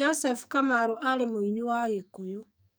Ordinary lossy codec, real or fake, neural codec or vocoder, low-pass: none; fake; codec, 44.1 kHz, 2.6 kbps, SNAC; none